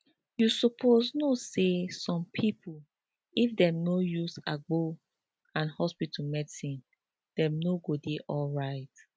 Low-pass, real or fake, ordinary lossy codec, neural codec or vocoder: none; real; none; none